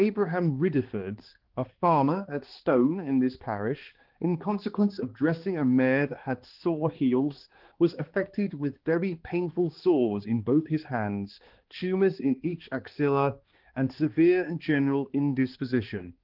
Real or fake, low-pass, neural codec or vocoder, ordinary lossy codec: fake; 5.4 kHz; codec, 16 kHz, 2 kbps, X-Codec, HuBERT features, trained on balanced general audio; Opus, 16 kbps